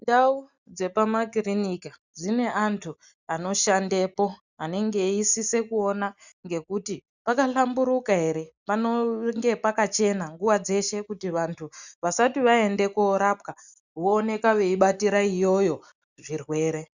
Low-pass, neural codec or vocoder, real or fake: 7.2 kHz; none; real